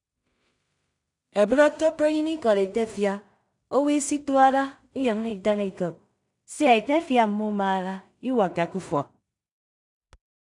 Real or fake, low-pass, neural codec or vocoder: fake; 10.8 kHz; codec, 16 kHz in and 24 kHz out, 0.4 kbps, LongCat-Audio-Codec, two codebook decoder